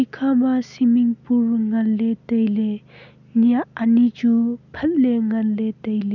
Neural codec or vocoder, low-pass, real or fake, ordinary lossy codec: none; 7.2 kHz; real; none